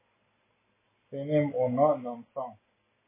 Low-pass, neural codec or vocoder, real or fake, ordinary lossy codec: 3.6 kHz; none; real; MP3, 16 kbps